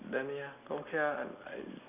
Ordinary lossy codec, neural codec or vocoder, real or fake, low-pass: none; none; real; 3.6 kHz